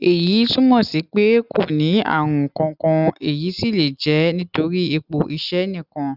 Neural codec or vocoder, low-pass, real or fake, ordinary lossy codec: none; 5.4 kHz; real; none